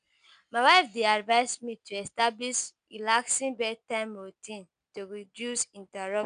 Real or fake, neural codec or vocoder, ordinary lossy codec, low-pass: real; none; none; 9.9 kHz